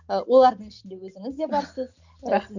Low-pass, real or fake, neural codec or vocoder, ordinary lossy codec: 7.2 kHz; real; none; none